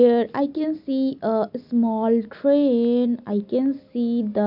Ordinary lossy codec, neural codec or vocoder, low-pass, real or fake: none; none; 5.4 kHz; real